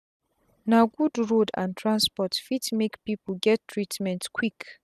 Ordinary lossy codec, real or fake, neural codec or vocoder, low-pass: none; real; none; 14.4 kHz